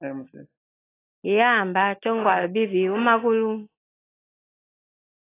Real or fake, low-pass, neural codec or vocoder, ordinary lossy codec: real; 3.6 kHz; none; AAC, 16 kbps